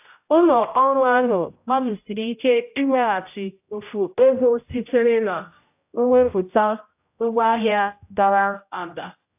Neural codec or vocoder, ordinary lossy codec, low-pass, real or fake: codec, 16 kHz, 0.5 kbps, X-Codec, HuBERT features, trained on general audio; AAC, 32 kbps; 3.6 kHz; fake